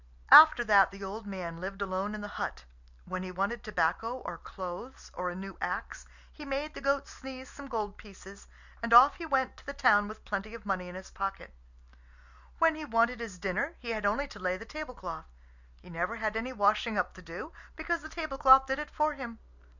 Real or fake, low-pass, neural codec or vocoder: real; 7.2 kHz; none